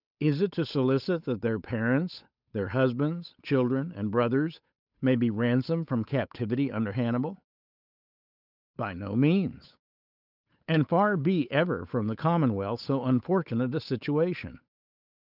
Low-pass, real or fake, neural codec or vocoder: 5.4 kHz; fake; codec, 16 kHz, 8 kbps, FunCodec, trained on Chinese and English, 25 frames a second